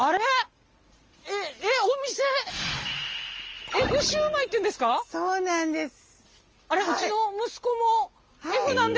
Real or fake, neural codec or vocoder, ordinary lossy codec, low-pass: real; none; Opus, 24 kbps; 7.2 kHz